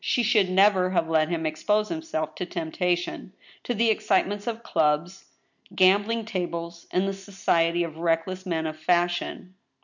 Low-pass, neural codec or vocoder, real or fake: 7.2 kHz; none; real